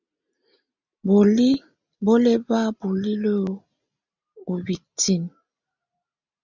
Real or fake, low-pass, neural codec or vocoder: real; 7.2 kHz; none